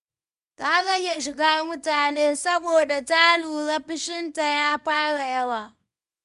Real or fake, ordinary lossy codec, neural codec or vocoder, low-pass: fake; Opus, 64 kbps; codec, 24 kHz, 0.9 kbps, WavTokenizer, small release; 10.8 kHz